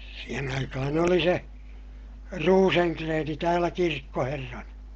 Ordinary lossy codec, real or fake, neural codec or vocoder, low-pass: Opus, 16 kbps; real; none; 7.2 kHz